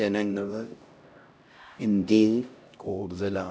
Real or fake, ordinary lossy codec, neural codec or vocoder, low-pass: fake; none; codec, 16 kHz, 0.5 kbps, X-Codec, HuBERT features, trained on LibriSpeech; none